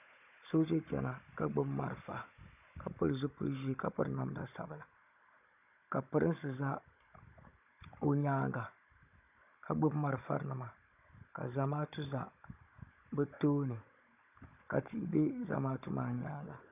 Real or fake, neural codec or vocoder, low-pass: fake; codec, 16 kHz, 16 kbps, FunCodec, trained on Chinese and English, 50 frames a second; 3.6 kHz